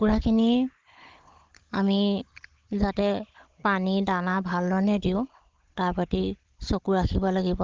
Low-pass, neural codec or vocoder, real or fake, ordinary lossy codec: 7.2 kHz; codec, 16 kHz, 16 kbps, FunCodec, trained on Chinese and English, 50 frames a second; fake; Opus, 16 kbps